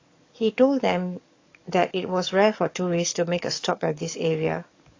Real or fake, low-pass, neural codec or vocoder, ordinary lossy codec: fake; 7.2 kHz; codec, 44.1 kHz, 7.8 kbps, DAC; AAC, 32 kbps